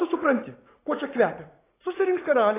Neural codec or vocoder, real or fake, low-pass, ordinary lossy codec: vocoder, 44.1 kHz, 80 mel bands, Vocos; fake; 3.6 kHz; AAC, 16 kbps